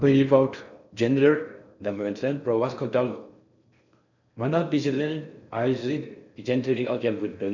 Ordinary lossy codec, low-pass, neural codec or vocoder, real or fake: none; 7.2 kHz; codec, 16 kHz in and 24 kHz out, 0.6 kbps, FocalCodec, streaming, 4096 codes; fake